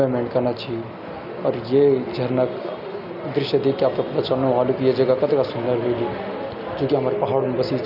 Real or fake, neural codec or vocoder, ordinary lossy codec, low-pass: real; none; none; 5.4 kHz